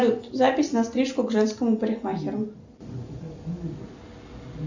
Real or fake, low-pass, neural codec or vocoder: real; 7.2 kHz; none